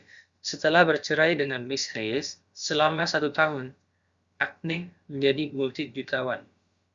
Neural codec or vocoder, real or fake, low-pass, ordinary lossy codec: codec, 16 kHz, about 1 kbps, DyCAST, with the encoder's durations; fake; 7.2 kHz; Opus, 64 kbps